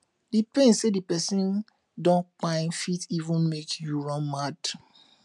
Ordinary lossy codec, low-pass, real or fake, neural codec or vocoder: MP3, 96 kbps; 10.8 kHz; real; none